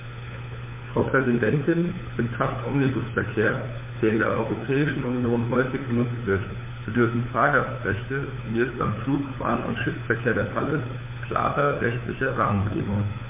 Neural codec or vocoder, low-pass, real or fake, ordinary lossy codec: codec, 16 kHz, 4 kbps, FunCodec, trained on LibriTTS, 50 frames a second; 3.6 kHz; fake; MP3, 24 kbps